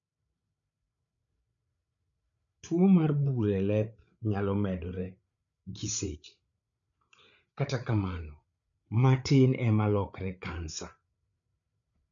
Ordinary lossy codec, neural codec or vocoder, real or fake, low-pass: none; codec, 16 kHz, 8 kbps, FreqCodec, larger model; fake; 7.2 kHz